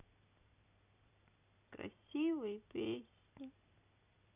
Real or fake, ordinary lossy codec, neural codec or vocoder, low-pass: fake; none; vocoder, 44.1 kHz, 128 mel bands every 256 samples, BigVGAN v2; 3.6 kHz